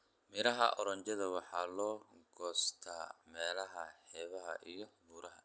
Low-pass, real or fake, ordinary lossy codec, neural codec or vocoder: none; real; none; none